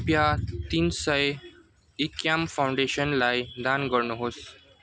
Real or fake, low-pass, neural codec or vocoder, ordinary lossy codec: real; none; none; none